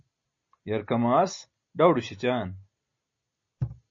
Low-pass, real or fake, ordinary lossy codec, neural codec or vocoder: 7.2 kHz; real; MP3, 32 kbps; none